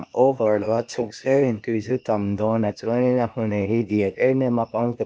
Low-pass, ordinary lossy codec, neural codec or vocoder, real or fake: none; none; codec, 16 kHz, 0.8 kbps, ZipCodec; fake